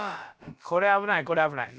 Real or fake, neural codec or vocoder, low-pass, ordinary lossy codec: fake; codec, 16 kHz, about 1 kbps, DyCAST, with the encoder's durations; none; none